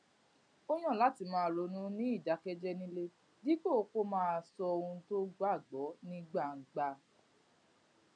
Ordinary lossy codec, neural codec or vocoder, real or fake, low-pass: MP3, 64 kbps; none; real; 9.9 kHz